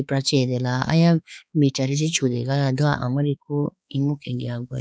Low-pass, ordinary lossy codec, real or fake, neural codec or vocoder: none; none; fake; codec, 16 kHz, 4 kbps, X-Codec, HuBERT features, trained on balanced general audio